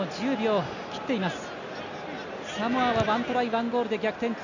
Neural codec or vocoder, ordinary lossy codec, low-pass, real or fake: none; none; 7.2 kHz; real